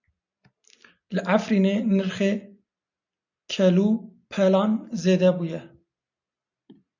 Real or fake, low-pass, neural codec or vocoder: real; 7.2 kHz; none